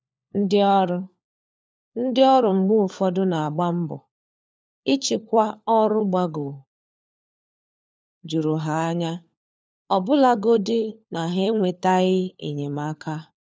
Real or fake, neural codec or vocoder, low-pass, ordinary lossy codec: fake; codec, 16 kHz, 4 kbps, FunCodec, trained on LibriTTS, 50 frames a second; none; none